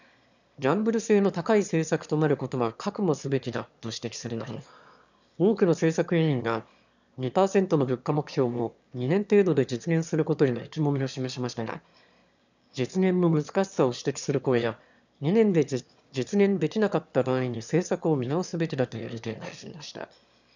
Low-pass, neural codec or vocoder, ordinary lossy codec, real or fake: 7.2 kHz; autoencoder, 22.05 kHz, a latent of 192 numbers a frame, VITS, trained on one speaker; none; fake